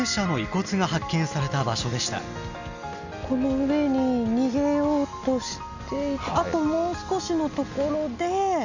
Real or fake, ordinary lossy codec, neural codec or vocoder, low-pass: real; none; none; 7.2 kHz